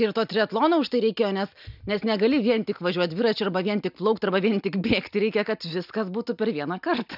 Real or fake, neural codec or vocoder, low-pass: real; none; 5.4 kHz